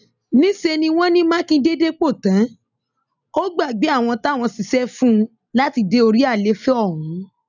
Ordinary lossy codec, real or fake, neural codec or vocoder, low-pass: none; real; none; 7.2 kHz